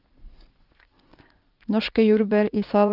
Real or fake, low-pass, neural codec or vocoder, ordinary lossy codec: fake; 5.4 kHz; vocoder, 44.1 kHz, 80 mel bands, Vocos; Opus, 24 kbps